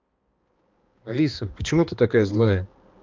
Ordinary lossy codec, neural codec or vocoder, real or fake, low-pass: Opus, 32 kbps; codec, 16 kHz, 2 kbps, X-Codec, HuBERT features, trained on balanced general audio; fake; 7.2 kHz